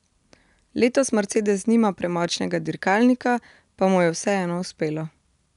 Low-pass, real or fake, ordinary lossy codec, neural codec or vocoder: 10.8 kHz; real; none; none